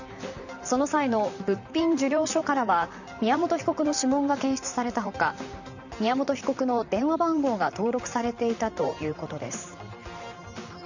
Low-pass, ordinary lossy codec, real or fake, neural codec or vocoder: 7.2 kHz; none; fake; vocoder, 44.1 kHz, 128 mel bands, Pupu-Vocoder